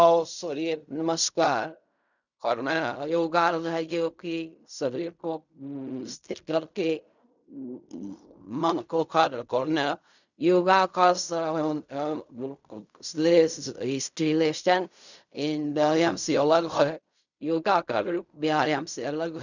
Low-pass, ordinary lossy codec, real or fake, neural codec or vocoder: 7.2 kHz; none; fake; codec, 16 kHz in and 24 kHz out, 0.4 kbps, LongCat-Audio-Codec, fine tuned four codebook decoder